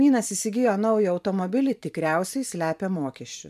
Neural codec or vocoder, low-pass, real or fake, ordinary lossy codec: vocoder, 44.1 kHz, 128 mel bands every 512 samples, BigVGAN v2; 14.4 kHz; fake; MP3, 96 kbps